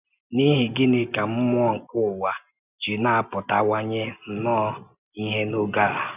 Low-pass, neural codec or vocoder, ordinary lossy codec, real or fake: 3.6 kHz; none; none; real